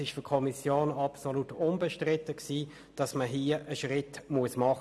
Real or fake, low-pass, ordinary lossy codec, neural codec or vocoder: real; none; none; none